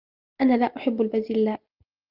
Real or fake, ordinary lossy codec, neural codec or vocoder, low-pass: real; Opus, 32 kbps; none; 5.4 kHz